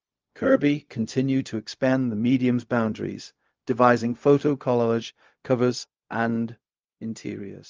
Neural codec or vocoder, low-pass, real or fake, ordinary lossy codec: codec, 16 kHz, 0.4 kbps, LongCat-Audio-Codec; 7.2 kHz; fake; Opus, 24 kbps